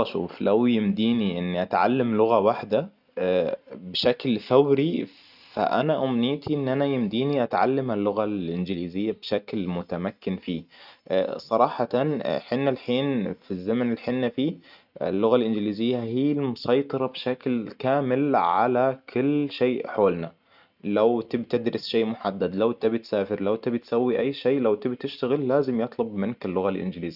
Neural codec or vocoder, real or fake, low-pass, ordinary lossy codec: none; real; 5.4 kHz; none